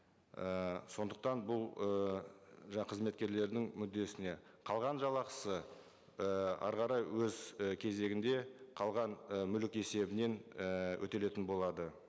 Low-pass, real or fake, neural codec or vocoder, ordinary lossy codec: none; real; none; none